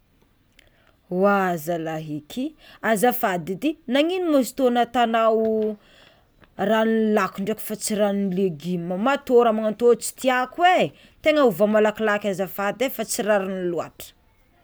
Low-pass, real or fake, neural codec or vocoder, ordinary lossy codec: none; real; none; none